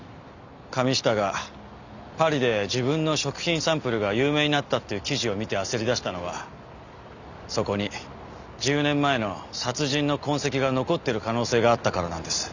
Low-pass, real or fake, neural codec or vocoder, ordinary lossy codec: 7.2 kHz; real; none; none